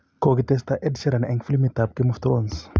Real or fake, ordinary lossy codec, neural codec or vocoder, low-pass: real; none; none; none